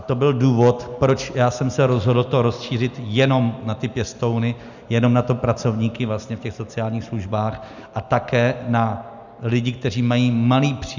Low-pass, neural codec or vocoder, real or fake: 7.2 kHz; none; real